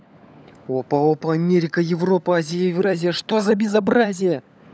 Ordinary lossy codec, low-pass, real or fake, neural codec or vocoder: none; none; fake; codec, 16 kHz, 16 kbps, FunCodec, trained on LibriTTS, 50 frames a second